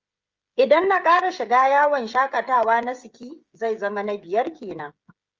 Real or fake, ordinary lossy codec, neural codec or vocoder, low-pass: fake; Opus, 32 kbps; codec, 16 kHz, 16 kbps, FreqCodec, smaller model; 7.2 kHz